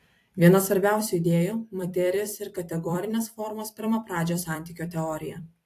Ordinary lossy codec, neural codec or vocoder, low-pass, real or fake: AAC, 64 kbps; vocoder, 44.1 kHz, 128 mel bands every 256 samples, BigVGAN v2; 14.4 kHz; fake